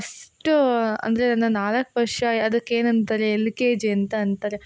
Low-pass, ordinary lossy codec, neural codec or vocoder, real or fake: none; none; none; real